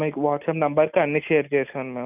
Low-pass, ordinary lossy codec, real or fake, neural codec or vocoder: 3.6 kHz; none; real; none